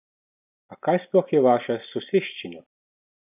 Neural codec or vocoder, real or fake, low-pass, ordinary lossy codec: none; real; 3.6 kHz; none